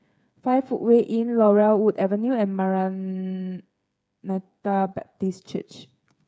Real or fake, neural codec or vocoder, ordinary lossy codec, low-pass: fake; codec, 16 kHz, 8 kbps, FreqCodec, smaller model; none; none